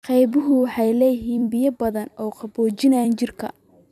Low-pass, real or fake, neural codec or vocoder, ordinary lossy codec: 14.4 kHz; fake; vocoder, 44.1 kHz, 128 mel bands every 256 samples, BigVGAN v2; none